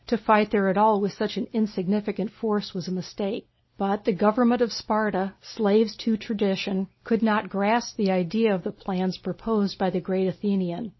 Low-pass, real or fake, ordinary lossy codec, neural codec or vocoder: 7.2 kHz; real; MP3, 24 kbps; none